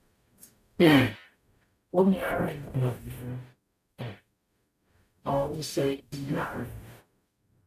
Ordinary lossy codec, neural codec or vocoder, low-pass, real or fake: none; codec, 44.1 kHz, 0.9 kbps, DAC; 14.4 kHz; fake